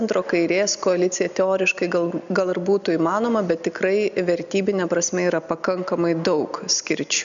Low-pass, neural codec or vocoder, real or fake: 7.2 kHz; none; real